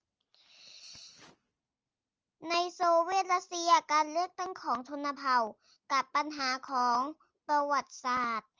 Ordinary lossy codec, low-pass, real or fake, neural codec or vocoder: Opus, 24 kbps; 7.2 kHz; real; none